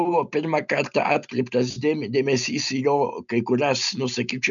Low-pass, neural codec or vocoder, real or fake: 7.2 kHz; none; real